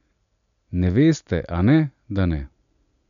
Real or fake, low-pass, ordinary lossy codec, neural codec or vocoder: real; 7.2 kHz; none; none